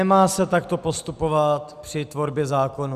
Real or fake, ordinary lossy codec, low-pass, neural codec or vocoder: real; Opus, 64 kbps; 14.4 kHz; none